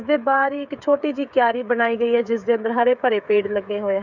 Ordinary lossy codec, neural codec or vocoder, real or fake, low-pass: none; codec, 16 kHz, 8 kbps, FreqCodec, smaller model; fake; 7.2 kHz